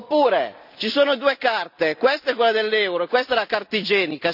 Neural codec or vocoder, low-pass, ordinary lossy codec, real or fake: none; 5.4 kHz; none; real